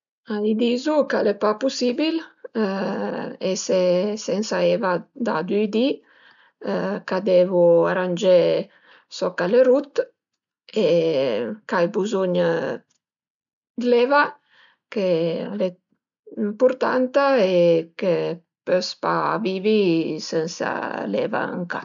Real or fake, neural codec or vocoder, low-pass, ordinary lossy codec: real; none; 7.2 kHz; none